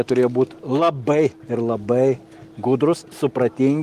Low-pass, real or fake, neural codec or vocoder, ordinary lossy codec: 14.4 kHz; real; none; Opus, 24 kbps